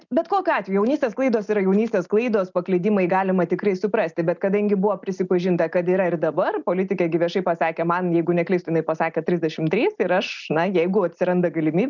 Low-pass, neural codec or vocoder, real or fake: 7.2 kHz; none; real